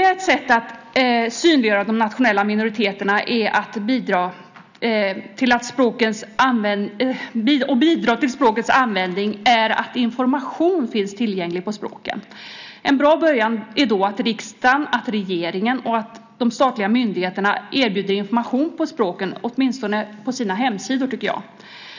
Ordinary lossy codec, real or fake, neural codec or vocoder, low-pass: none; real; none; 7.2 kHz